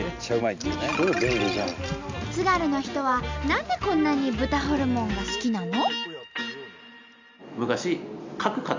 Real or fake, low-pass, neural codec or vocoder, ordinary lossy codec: real; 7.2 kHz; none; MP3, 64 kbps